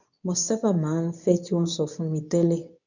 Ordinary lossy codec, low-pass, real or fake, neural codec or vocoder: none; 7.2 kHz; fake; codec, 24 kHz, 0.9 kbps, WavTokenizer, medium speech release version 2